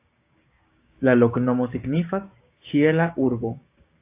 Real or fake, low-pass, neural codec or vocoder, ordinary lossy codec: fake; 3.6 kHz; codec, 16 kHz, 6 kbps, DAC; AAC, 24 kbps